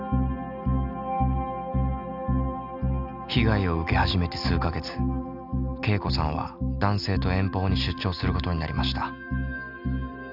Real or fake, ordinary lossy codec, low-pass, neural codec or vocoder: real; none; 5.4 kHz; none